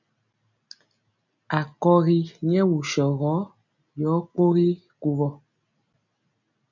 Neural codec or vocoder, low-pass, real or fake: none; 7.2 kHz; real